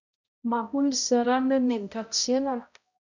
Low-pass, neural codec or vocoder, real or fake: 7.2 kHz; codec, 16 kHz, 0.5 kbps, X-Codec, HuBERT features, trained on balanced general audio; fake